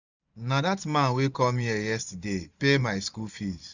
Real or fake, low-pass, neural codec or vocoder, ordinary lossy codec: fake; 7.2 kHz; vocoder, 44.1 kHz, 128 mel bands every 512 samples, BigVGAN v2; none